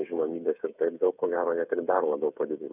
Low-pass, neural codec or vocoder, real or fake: 3.6 kHz; codec, 16 kHz, 8 kbps, FreqCodec, smaller model; fake